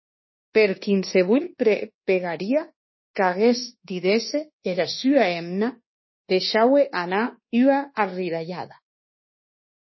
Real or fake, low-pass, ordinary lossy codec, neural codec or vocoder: fake; 7.2 kHz; MP3, 24 kbps; codec, 24 kHz, 1.2 kbps, DualCodec